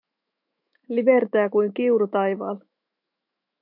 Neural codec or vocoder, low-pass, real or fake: autoencoder, 48 kHz, 128 numbers a frame, DAC-VAE, trained on Japanese speech; 5.4 kHz; fake